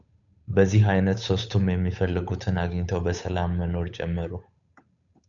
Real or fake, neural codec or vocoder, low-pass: fake; codec, 16 kHz, 8 kbps, FunCodec, trained on Chinese and English, 25 frames a second; 7.2 kHz